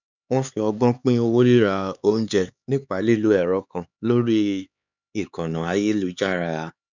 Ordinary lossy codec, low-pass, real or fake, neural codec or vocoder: none; 7.2 kHz; fake; codec, 16 kHz, 4 kbps, X-Codec, HuBERT features, trained on LibriSpeech